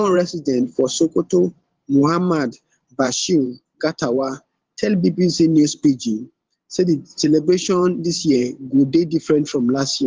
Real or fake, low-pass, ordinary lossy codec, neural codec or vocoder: fake; 7.2 kHz; Opus, 16 kbps; vocoder, 44.1 kHz, 128 mel bands every 512 samples, BigVGAN v2